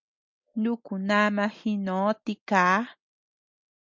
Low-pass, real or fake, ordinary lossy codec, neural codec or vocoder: 7.2 kHz; real; MP3, 64 kbps; none